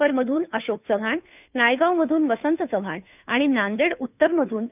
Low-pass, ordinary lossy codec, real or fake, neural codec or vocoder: 3.6 kHz; none; fake; codec, 16 kHz, 2 kbps, FunCodec, trained on Chinese and English, 25 frames a second